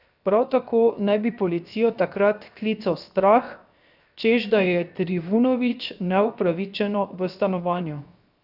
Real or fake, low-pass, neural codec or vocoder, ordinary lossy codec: fake; 5.4 kHz; codec, 16 kHz, 0.7 kbps, FocalCodec; none